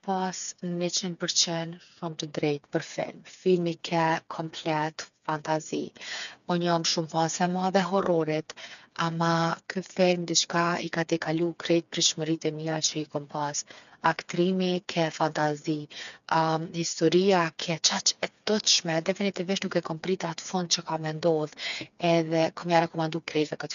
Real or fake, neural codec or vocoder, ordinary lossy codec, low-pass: fake; codec, 16 kHz, 4 kbps, FreqCodec, smaller model; none; 7.2 kHz